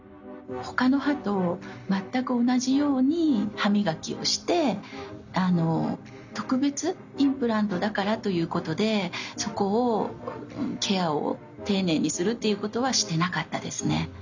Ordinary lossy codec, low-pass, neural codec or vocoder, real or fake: none; 7.2 kHz; none; real